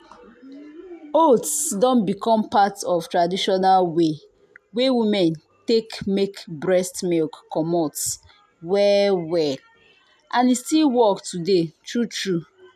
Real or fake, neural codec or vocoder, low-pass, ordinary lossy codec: real; none; 14.4 kHz; none